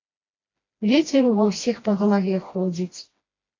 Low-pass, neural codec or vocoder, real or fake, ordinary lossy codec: 7.2 kHz; codec, 16 kHz, 1 kbps, FreqCodec, smaller model; fake; AAC, 32 kbps